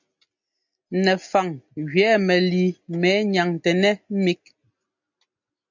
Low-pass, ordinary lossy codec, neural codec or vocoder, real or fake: 7.2 kHz; MP3, 64 kbps; none; real